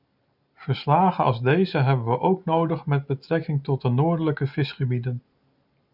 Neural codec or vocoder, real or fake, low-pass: none; real; 5.4 kHz